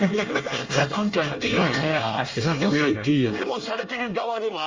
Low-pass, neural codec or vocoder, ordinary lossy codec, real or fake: 7.2 kHz; codec, 24 kHz, 1 kbps, SNAC; Opus, 32 kbps; fake